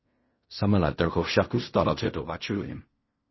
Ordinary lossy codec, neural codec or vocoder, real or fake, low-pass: MP3, 24 kbps; codec, 16 kHz in and 24 kHz out, 0.4 kbps, LongCat-Audio-Codec, fine tuned four codebook decoder; fake; 7.2 kHz